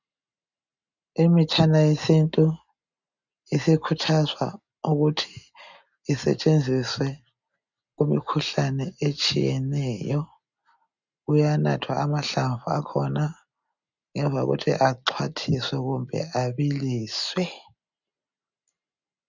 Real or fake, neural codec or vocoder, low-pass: real; none; 7.2 kHz